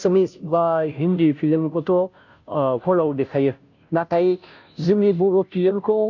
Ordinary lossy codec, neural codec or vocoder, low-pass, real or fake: none; codec, 16 kHz, 0.5 kbps, FunCodec, trained on Chinese and English, 25 frames a second; 7.2 kHz; fake